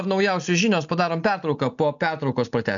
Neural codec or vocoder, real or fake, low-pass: none; real; 7.2 kHz